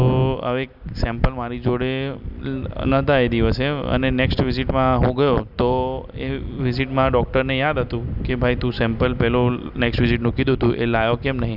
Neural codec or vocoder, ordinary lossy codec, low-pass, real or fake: none; none; 5.4 kHz; real